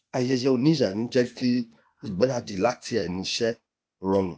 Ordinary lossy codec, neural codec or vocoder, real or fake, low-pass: none; codec, 16 kHz, 0.8 kbps, ZipCodec; fake; none